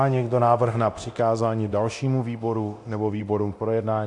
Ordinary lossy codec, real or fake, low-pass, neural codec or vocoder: AAC, 64 kbps; fake; 10.8 kHz; codec, 24 kHz, 0.9 kbps, DualCodec